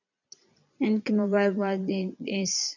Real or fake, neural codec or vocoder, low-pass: fake; vocoder, 22.05 kHz, 80 mel bands, Vocos; 7.2 kHz